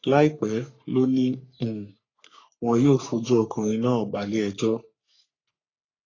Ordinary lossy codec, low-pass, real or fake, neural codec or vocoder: AAC, 32 kbps; 7.2 kHz; fake; codec, 44.1 kHz, 3.4 kbps, Pupu-Codec